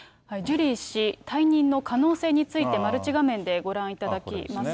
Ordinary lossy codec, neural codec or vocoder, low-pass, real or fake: none; none; none; real